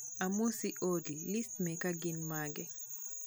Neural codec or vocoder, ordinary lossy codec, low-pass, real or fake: none; none; none; real